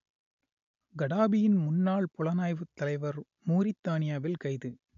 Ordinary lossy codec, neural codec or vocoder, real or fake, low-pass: none; none; real; 7.2 kHz